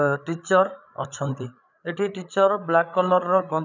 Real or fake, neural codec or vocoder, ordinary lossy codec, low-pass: fake; codec, 16 kHz, 8 kbps, FreqCodec, larger model; none; 7.2 kHz